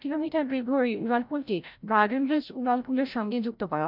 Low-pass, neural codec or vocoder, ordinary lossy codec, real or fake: 5.4 kHz; codec, 16 kHz, 0.5 kbps, FreqCodec, larger model; none; fake